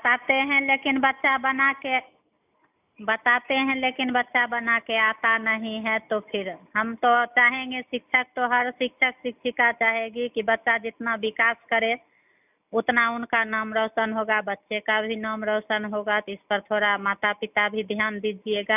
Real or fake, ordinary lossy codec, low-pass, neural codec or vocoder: real; none; 3.6 kHz; none